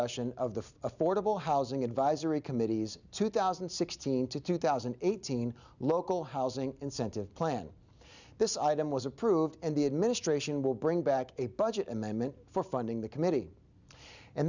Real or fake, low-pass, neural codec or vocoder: real; 7.2 kHz; none